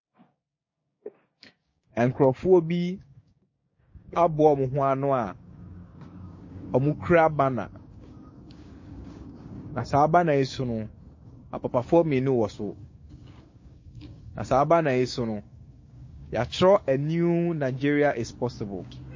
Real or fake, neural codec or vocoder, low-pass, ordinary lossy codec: fake; codec, 16 kHz, 6 kbps, DAC; 7.2 kHz; MP3, 32 kbps